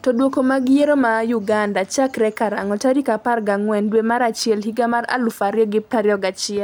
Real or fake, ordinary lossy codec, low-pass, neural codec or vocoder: real; none; none; none